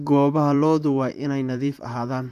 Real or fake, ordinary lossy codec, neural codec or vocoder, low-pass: fake; none; vocoder, 44.1 kHz, 128 mel bands, Pupu-Vocoder; 14.4 kHz